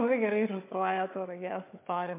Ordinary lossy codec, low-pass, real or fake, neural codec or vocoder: MP3, 24 kbps; 3.6 kHz; fake; codec, 16 kHz, 4 kbps, FunCodec, trained on Chinese and English, 50 frames a second